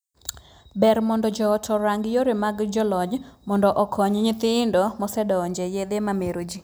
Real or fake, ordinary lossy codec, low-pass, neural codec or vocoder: real; none; none; none